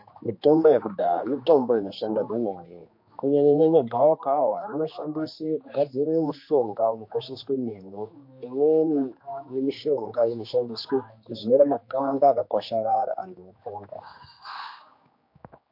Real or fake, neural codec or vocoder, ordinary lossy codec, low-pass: fake; codec, 16 kHz, 2 kbps, X-Codec, HuBERT features, trained on general audio; MP3, 32 kbps; 5.4 kHz